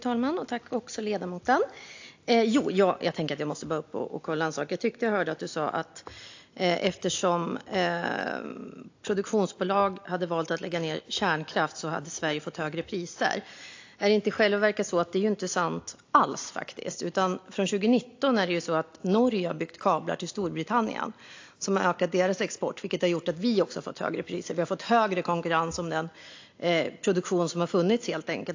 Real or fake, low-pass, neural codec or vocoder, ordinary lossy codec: real; 7.2 kHz; none; AAC, 48 kbps